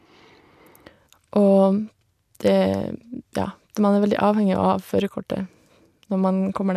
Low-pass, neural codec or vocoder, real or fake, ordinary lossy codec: 14.4 kHz; none; real; none